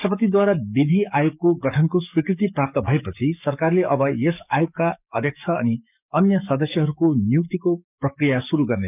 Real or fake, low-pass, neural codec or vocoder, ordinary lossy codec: fake; 3.6 kHz; codec, 44.1 kHz, 7.8 kbps, DAC; none